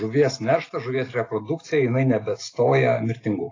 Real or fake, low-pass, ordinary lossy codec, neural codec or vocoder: real; 7.2 kHz; AAC, 32 kbps; none